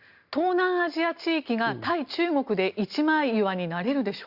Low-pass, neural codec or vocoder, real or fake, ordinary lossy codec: 5.4 kHz; none; real; Opus, 64 kbps